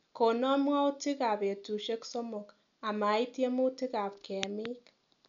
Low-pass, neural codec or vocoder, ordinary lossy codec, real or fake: 7.2 kHz; none; none; real